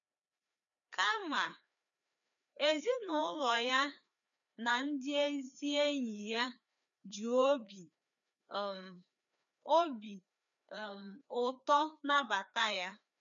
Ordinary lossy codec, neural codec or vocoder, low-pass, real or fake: none; codec, 16 kHz, 2 kbps, FreqCodec, larger model; 7.2 kHz; fake